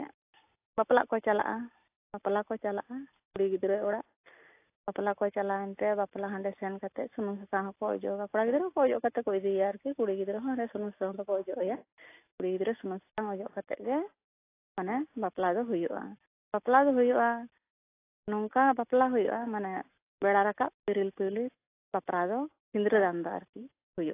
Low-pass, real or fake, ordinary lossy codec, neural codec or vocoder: 3.6 kHz; real; AAC, 24 kbps; none